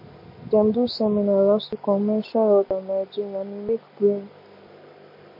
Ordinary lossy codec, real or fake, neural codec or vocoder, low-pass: MP3, 32 kbps; real; none; 5.4 kHz